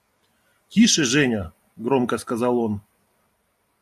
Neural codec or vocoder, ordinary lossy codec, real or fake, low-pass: none; AAC, 96 kbps; real; 14.4 kHz